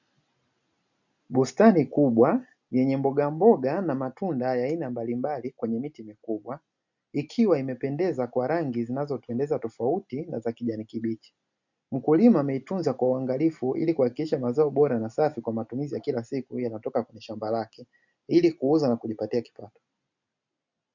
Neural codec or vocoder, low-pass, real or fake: none; 7.2 kHz; real